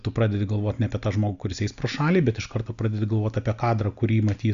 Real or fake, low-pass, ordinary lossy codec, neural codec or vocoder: real; 7.2 kHz; Opus, 64 kbps; none